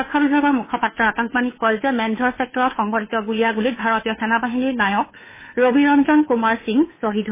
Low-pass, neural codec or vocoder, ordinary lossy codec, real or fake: 3.6 kHz; codec, 16 kHz, 2 kbps, FunCodec, trained on Chinese and English, 25 frames a second; MP3, 16 kbps; fake